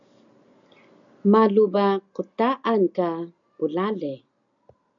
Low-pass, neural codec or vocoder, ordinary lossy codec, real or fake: 7.2 kHz; none; MP3, 96 kbps; real